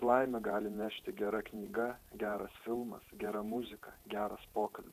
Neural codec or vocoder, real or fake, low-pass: vocoder, 48 kHz, 128 mel bands, Vocos; fake; 14.4 kHz